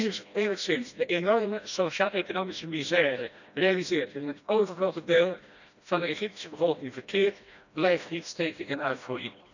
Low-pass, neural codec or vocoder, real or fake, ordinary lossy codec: 7.2 kHz; codec, 16 kHz, 1 kbps, FreqCodec, smaller model; fake; none